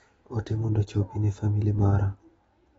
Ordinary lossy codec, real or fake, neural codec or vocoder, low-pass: AAC, 24 kbps; real; none; 19.8 kHz